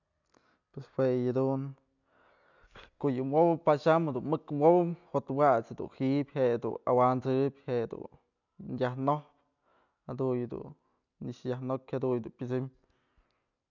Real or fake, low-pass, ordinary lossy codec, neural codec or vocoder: real; 7.2 kHz; none; none